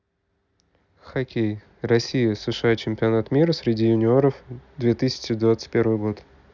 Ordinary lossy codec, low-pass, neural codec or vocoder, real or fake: none; 7.2 kHz; none; real